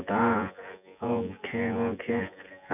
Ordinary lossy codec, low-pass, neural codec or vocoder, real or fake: none; 3.6 kHz; vocoder, 24 kHz, 100 mel bands, Vocos; fake